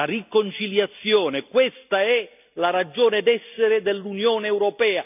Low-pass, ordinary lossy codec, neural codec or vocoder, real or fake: 3.6 kHz; none; none; real